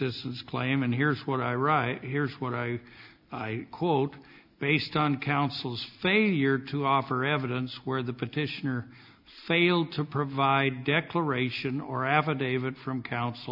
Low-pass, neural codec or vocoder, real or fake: 5.4 kHz; none; real